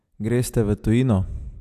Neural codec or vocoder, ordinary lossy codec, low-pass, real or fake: none; AAC, 96 kbps; 14.4 kHz; real